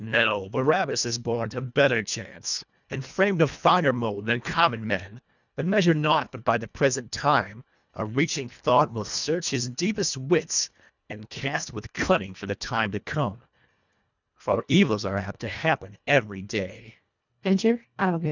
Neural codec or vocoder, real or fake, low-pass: codec, 24 kHz, 1.5 kbps, HILCodec; fake; 7.2 kHz